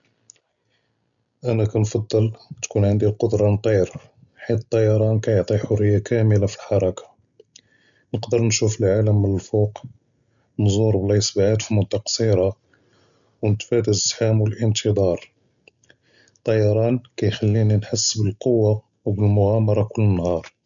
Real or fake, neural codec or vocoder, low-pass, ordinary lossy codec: real; none; 7.2 kHz; none